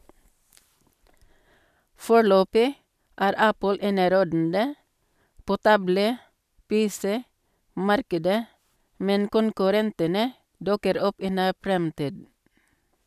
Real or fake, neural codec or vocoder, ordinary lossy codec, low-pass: real; none; none; 14.4 kHz